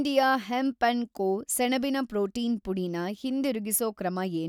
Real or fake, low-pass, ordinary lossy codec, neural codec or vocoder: real; 19.8 kHz; none; none